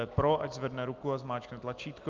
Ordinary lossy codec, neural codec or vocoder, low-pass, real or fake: Opus, 24 kbps; none; 7.2 kHz; real